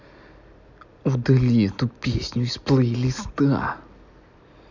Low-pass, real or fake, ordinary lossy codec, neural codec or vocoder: 7.2 kHz; real; none; none